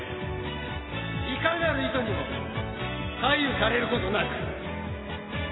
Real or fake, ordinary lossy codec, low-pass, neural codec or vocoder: real; AAC, 16 kbps; 7.2 kHz; none